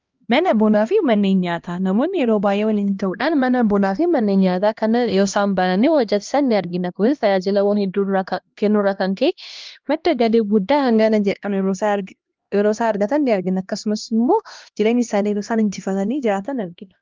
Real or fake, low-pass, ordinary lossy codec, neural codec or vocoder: fake; 7.2 kHz; Opus, 32 kbps; codec, 16 kHz, 1 kbps, X-Codec, HuBERT features, trained on LibriSpeech